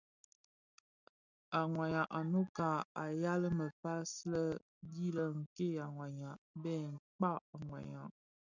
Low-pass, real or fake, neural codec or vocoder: 7.2 kHz; real; none